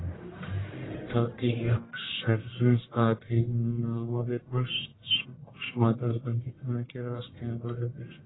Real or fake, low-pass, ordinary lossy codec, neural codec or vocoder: fake; 7.2 kHz; AAC, 16 kbps; codec, 44.1 kHz, 1.7 kbps, Pupu-Codec